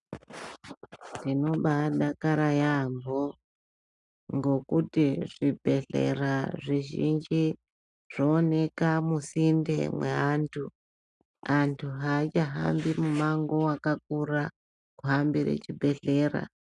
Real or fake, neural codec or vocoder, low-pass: real; none; 10.8 kHz